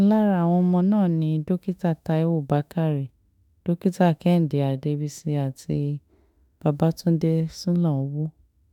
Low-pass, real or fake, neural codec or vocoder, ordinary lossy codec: 19.8 kHz; fake; autoencoder, 48 kHz, 32 numbers a frame, DAC-VAE, trained on Japanese speech; none